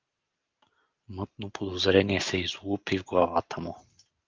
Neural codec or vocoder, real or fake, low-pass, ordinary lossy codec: none; real; 7.2 kHz; Opus, 32 kbps